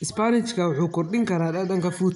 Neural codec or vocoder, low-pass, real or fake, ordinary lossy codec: none; 10.8 kHz; real; none